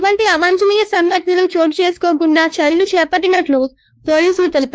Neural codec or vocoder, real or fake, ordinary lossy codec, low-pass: codec, 16 kHz, 2 kbps, X-Codec, WavLM features, trained on Multilingual LibriSpeech; fake; none; none